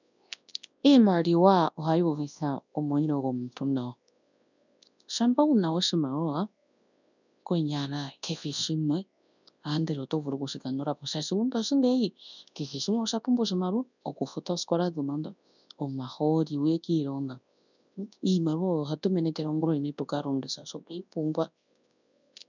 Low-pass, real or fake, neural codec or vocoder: 7.2 kHz; fake; codec, 24 kHz, 0.9 kbps, WavTokenizer, large speech release